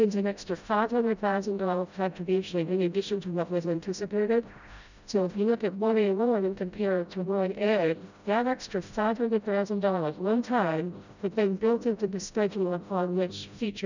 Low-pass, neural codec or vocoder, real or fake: 7.2 kHz; codec, 16 kHz, 0.5 kbps, FreqCodec, smaller model; fake